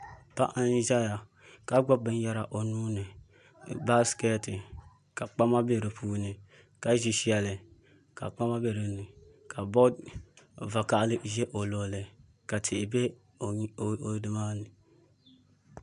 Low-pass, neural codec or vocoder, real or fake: 10.8 kHz; none; real